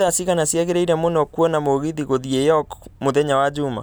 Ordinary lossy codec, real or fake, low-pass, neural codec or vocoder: none; real; none; none